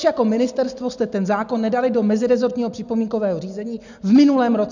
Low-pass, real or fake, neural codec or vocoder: 7.2 kHz; real; none